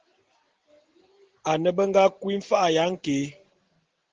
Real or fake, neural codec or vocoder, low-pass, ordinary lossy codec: real; none; 7.2 kHz; Opus, 16 kbps